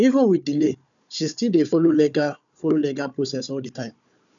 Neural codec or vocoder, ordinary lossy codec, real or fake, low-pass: codec, 16 kHz, 4 kbps, FunCodec, trained on Chinese and English, 50 frames a second; none; fake; 7.2 kHz